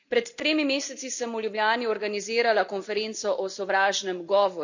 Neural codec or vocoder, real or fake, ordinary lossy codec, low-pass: none; real; none; 7.2 kHz